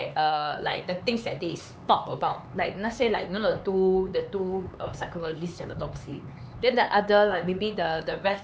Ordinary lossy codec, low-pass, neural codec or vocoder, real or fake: none; none; codec, 16 kHz, 4 kbps, X-Codec, HuBERT features, trained on LibriSpeech; fake